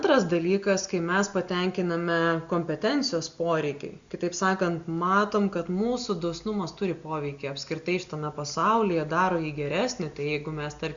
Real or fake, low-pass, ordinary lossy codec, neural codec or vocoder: real; 7.2 kHz; Opus, 64 kbps; none